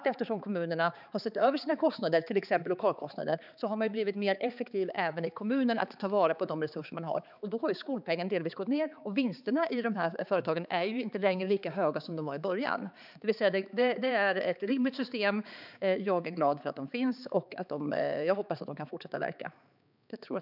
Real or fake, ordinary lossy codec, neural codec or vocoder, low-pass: fake; none; codec, 16 kHz, 4 kbps, X-Codec, HuBERT features, trained on balanced general audio; 5.4 kHz